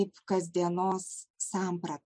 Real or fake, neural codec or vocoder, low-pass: real; none; 9.9 kHz